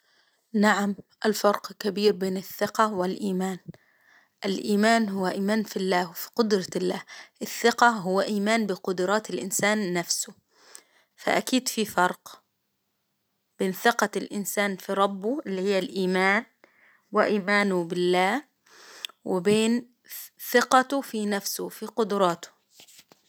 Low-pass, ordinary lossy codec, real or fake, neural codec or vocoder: none; none; real; none